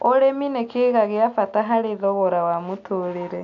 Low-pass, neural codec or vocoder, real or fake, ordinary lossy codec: 7.2 kHz; none; real; MP3, 96 kbps